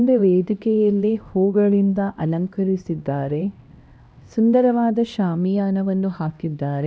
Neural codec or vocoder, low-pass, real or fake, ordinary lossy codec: codec, 16 kHz, 1 kbps, X-Codec, HuBERT features, trained on LibriSpeech; none; fake; none